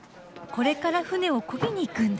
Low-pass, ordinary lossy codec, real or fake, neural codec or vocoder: none; none; real; none